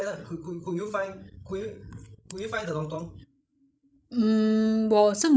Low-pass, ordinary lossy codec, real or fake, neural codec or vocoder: none; none; fake; codec, 16 kHz, 16 kbps, FreqCodec, larger model